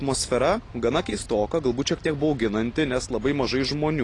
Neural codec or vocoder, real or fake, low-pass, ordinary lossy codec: none; real; 10.8 kHz; AAC, 32 kbps